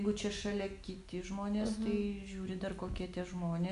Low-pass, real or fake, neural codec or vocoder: 10.8 kHz; real; none